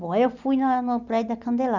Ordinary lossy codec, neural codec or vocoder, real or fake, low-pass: none; none; real; 7.2 kHz